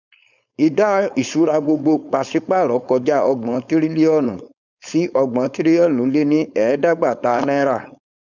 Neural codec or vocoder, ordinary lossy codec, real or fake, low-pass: codec, 16 kHz, 4.8 kbps, FACodec; none; fake; 7.2 kHz